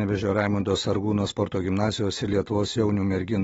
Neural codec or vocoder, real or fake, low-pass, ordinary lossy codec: none; real; 14.4 kHz; AAC, 24 kbps